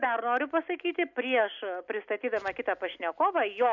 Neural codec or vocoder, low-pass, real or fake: none; 7.2 kHz; real